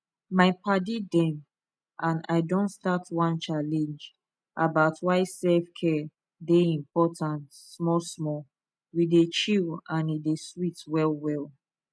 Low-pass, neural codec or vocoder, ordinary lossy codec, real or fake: none; none; none; real